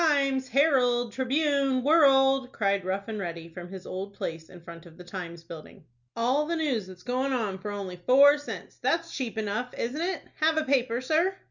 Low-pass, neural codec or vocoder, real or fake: 7.2 kHz; none; real